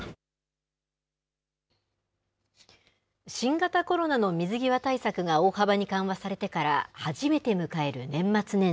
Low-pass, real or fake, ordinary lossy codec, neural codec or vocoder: none; real; none; none